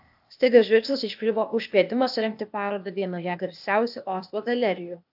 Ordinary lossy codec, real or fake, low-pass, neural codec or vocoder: AAC, 48 kbps; fake; 5.4 kHz; codec, 16 kHz, 0.8 kbps, ZipCodec